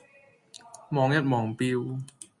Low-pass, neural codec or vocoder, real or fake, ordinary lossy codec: 10.8 kHz; none; real; MP3, 48 kbps